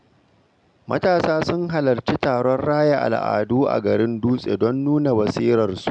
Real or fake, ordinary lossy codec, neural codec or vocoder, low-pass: real; none; none; 9.9 kHz